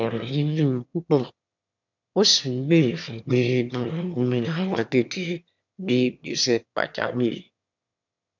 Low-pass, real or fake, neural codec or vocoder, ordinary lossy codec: 7.2 kHz; fake; autoencoder, 22.05 kHz, a latent of 192 numbers a frame, VITS, trained on one speaker; none